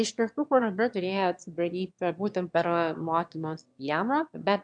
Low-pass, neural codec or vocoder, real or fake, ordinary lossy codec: 9.9 kHz; autoencoder, 22.05 kHz, a latent of 192 numbers a frame, VITS, trained on one speaker; fake; MP3, 64 kbps